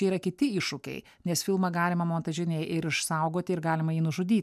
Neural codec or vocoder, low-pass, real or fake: none; 14.4 kHz; real